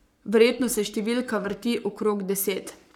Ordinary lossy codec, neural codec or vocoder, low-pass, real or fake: none; codec, 44.1 kHz, 7.8 kbps, Pupu-Codec; 19.8 kHz; fake